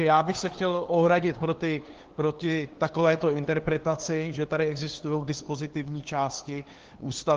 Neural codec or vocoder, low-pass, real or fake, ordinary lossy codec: codec, 16 kHz, 2 kbps, FunCodec, trained on LibriTTS, 25 frames a second; 7.2 kHz; fake; Opus, 16 kbps